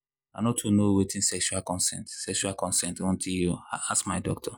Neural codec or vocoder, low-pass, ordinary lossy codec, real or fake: none; none; none; real